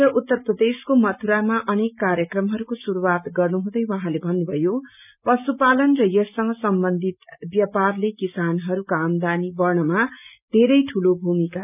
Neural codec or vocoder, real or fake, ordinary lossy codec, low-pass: none; real; none; 3.6 kHz